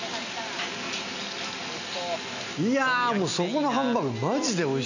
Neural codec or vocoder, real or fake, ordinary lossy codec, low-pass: none; real; none; 7.2 kHz